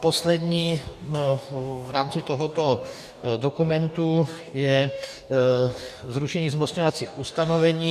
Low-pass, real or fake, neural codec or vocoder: 14.4 kHz; fake; codec, 44.1 kHz, 2.6 kbps, DAC